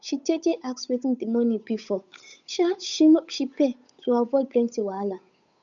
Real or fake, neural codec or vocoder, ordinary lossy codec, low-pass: fake; codec, 16 kHz, 8 kbps, FunCodec, trained on Chinese and English, 25 frames a second; none; 7.2 kHz